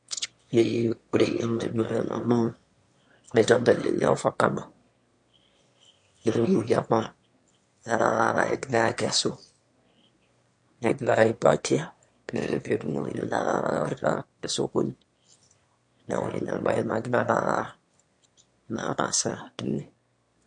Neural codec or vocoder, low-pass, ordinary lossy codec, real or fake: autoencoder, 22.05 kHz, a latent of 192 numbers a frame, VITS, trained on one speaker; 9.9 kHz; MP3, 48 kbps; fake